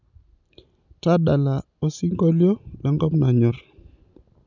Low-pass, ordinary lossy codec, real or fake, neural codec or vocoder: 7.2 kHz; none; real; none